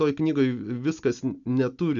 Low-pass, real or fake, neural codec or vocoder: 7.2 kHz; real; none